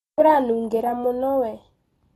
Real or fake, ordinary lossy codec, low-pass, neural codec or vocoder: real; AAC, 32 kbps; 19.8 kHz; none